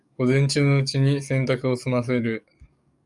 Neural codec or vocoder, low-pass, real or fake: codec, 44.1 kHz, 7.8 kbps, DAC; 10.8 kHz; fake